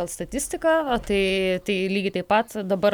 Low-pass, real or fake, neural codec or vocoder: 19.8 kHz; real; none